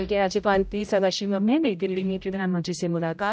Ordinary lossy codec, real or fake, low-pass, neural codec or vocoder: none; fake; none; codec, 16 kHz, 0.5 kbps, X-Codec, HuBERT features, trained on general audio